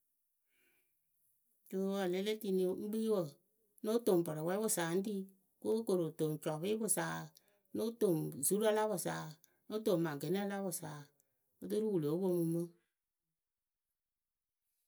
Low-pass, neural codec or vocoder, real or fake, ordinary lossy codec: none; none; real; none